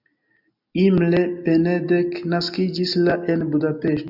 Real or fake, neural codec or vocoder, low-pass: real; none; 5.4 kHz